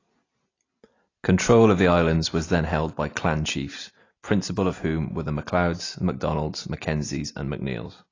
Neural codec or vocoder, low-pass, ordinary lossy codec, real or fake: none; 7.2 kHz; AAC, 32 kbps; real